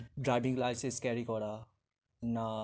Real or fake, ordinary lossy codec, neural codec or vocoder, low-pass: real; none; none; none